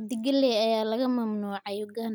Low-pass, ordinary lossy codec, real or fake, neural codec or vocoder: none; none; real; none